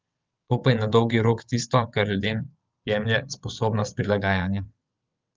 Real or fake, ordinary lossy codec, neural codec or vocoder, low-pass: fake; Opus, 24 kbps; vocoder, 22.05 kHz, 80 mel bands, WaveNeXt; 7.2 kHz